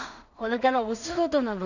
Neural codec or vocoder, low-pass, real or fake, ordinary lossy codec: codec, 16 kHz in and 24 kHz out, 0.4 kbps, LongCat-Audio-Codec, two codebook decoder; 7.2 kHz; fake; none